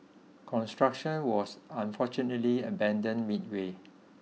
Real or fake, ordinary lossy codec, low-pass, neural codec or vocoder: real; none; none; none